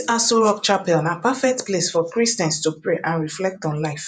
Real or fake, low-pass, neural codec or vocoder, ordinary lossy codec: fake; 9.9 kHz; vocoder, 48 kHz, 128 mel bands, Vocos; none